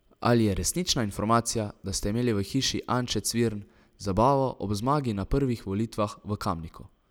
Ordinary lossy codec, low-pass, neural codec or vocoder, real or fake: none; none; none; real